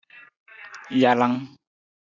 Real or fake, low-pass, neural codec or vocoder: real; 7.2 kHz; none